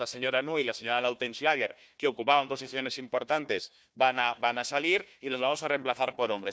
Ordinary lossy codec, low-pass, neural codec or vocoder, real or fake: none; none; codec, 16 kHz, 1 kbps, FunCodec, trained on Chinese and English, 50 frames a second; fake